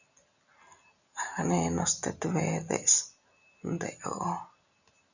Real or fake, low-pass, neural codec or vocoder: real; 7.2 kHz; none